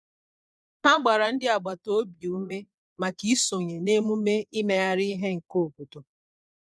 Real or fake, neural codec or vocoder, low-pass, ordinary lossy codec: fake; vocoder, 22.05 kHz, 80 mel bands, Vocos; none; none